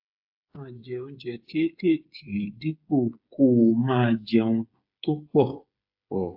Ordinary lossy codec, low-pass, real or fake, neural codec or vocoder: AAC, 48 kbps; 5.4 kHz; fake; codec, 16 kHz, 8 kbps, FreqCodec, smaller model